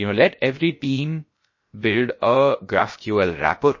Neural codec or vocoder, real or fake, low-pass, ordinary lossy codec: codec, 16 kHz, about 1 kbps, DyCAST, with the encoder's durations; fake; 7.2 kHz; MP3, 32 kbps